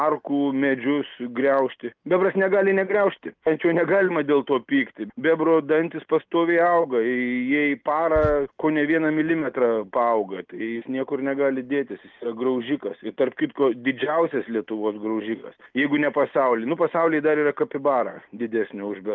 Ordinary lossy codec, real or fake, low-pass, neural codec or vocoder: Opus, 32 kbps; real; 7.2 kHz; none